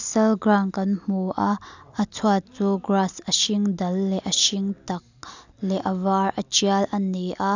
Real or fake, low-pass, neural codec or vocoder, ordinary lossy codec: real; 7.2 kHz; none; none